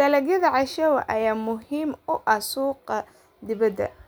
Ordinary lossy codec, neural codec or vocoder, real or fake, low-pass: none; none; real; none